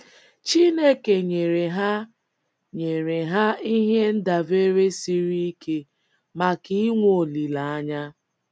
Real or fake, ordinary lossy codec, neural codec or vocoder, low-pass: real; none; none; none